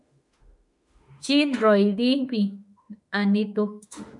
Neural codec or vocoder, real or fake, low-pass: autoencoder, 48 kHz, 32 numbers a frame, DAC-VAE, trained on Japanese speech; fake; 10.8 kHz